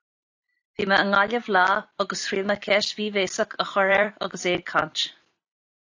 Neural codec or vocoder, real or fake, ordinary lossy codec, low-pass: vocoder, 44.1 kHz, 128 mel bands every 256 samples, BigVGAN v2; fake; AAC, 48 kbps; 7.2 kHz